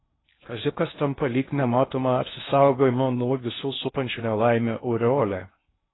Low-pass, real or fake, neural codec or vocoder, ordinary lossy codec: 7.2 kHz; fake; codec, 16 kHz in and 24 kHz out, 0.6 kbps, FocalCodec, streaming, 2048 codes; AAC, 16 kbps